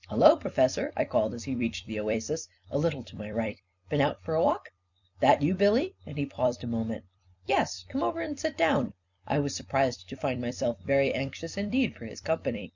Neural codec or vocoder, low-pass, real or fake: vocoder, 44.1 kHz, 128 mel bands every 256 samples, BigVGAN v2; 7.2 kHz; fake